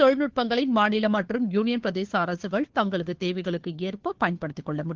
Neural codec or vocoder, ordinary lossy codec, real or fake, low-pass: codec, 16 kHz, 2 kbps, FunCodec, trained on Chinese and English, 25 frames a second; Opus, 16 kbps; fake; 7.2 kHz